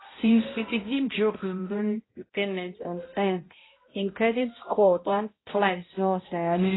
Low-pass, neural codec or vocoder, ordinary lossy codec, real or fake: 7.2 kHz; codec, 16 kHz, 0.5 kbps, X-Codec, HuBERT features, trained on balanced general audio; AAC, 16 kbps; fake